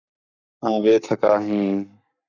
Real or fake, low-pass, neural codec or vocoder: fake; 7.2 kHz; codec, 44.1 kHz, 7.8 kbps, Pupu-Codec